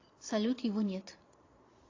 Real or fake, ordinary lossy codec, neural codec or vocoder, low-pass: fake; AAC, 32 kbps; vocoder, 22.05 kHz, 80 mel bands, Vocos; 7.2 kHz